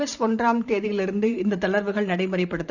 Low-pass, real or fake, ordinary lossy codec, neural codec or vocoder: 7.2 kHz; fake; none; vocoder, 44.1 kHz, 128 mel bands every 512 samples, BigVGAN v2